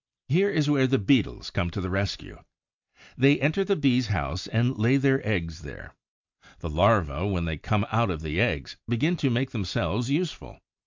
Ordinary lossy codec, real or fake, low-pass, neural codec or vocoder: MP3, 64 kbps; real; 7.2 kHz; none